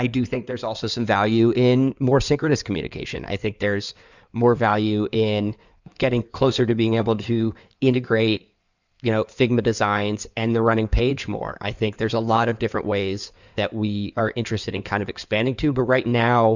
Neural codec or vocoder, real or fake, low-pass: codec, 16 kHz in and 24 kHz out, 2.2 kbps, FireRedTTS-2 codec; fake; 7.2 kHz